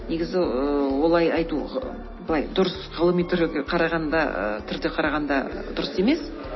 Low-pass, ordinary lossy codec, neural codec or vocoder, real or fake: 7.2 kHz; MP3, 24 kbps; none; real